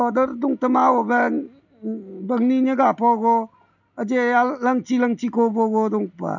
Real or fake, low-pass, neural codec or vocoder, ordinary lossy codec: real; 7.2 kHz; none; none